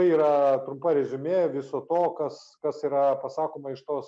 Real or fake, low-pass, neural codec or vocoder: real; 9.9 kHz; none